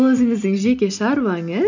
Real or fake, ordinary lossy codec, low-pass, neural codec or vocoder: real; none; 7.2 kHz; none